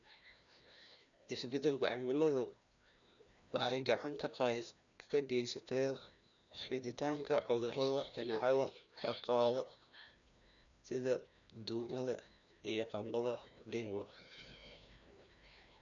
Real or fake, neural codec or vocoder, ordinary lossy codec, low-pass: fake; codec, 16 kHz, 1 kbps, FreqCodec, larger model; none; 7.2 kHz